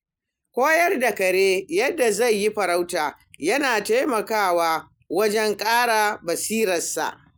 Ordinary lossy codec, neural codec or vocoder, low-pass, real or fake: none; none; none; real